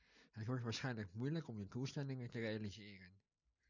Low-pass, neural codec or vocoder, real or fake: 7.2 kHz; none; real